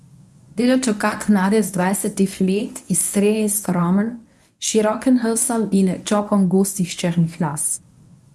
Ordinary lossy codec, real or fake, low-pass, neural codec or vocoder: none; fake; none; codec, 24 kHz, 0.9 kbps, WavTokenizer, medium speech release version 1